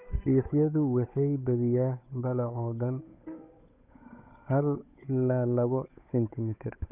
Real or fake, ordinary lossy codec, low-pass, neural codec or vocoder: fake; none; 3.6 kHz; codec, 16 kHz, 8 kbps, FunCodec, trained on Chinese and English, 25 frames a second